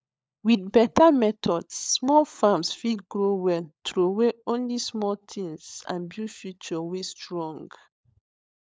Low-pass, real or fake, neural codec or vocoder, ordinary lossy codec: none; fake; codec, 16 kHz, 16 kbps, FunCodec, trained on LibriTTS, 50 frames a second; none